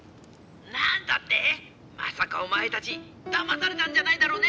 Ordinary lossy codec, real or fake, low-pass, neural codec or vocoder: none; real; none; none